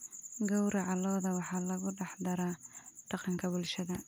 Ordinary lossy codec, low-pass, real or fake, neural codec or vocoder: none; none; real; none